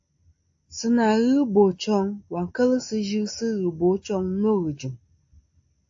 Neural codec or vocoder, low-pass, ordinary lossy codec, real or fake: none; 7.2 kHz; AAC, 48 kbps; real